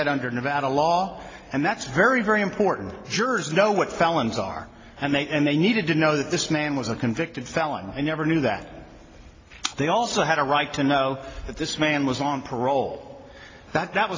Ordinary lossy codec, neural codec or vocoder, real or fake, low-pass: AAC, 32 kbps; none; real; 7.2 kHz